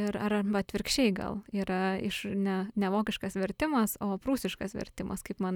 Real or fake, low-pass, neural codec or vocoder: real; 19.8 kHz; none